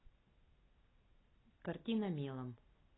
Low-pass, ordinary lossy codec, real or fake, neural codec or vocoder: 7.2 kHz; AAC, 16 kbps; real; none